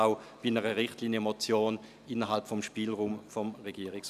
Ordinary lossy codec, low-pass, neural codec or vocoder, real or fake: none; 14.4 kHz; none; real